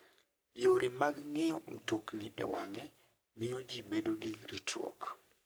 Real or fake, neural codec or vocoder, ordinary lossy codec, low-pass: fake; codec, 44.1 kHz, 3.4 kbps, Pupu-Codec; none; none